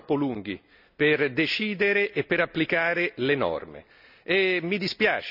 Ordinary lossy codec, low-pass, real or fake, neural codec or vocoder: none; 5.4 kHz; real; none